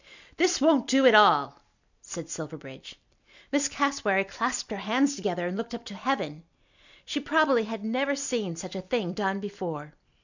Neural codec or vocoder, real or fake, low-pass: none; real; 7.2 kHz